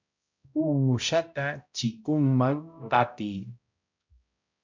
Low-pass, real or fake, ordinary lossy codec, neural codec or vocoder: 7.2 kHz; fake; MP3, 64 kbps; codec, 16 kHz, 0.5 kbps, X-Codec, HuBERT features, trained on balanced general audio